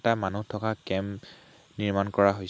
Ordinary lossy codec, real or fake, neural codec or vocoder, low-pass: none; real; none; none